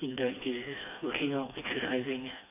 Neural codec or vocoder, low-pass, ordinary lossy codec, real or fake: codec, 16 kHz, 2 kbps, FreqCodec, smaller model; 3.6 kHz; AAC, 24 kbps; fake